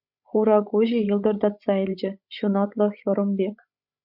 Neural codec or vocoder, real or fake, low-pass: codec, 16 kHz, 16 kbps, FreqCodec, larger model; fake; 5.4 kHz